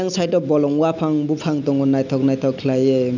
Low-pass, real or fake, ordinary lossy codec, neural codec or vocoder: 7.2 kHz; real; none; none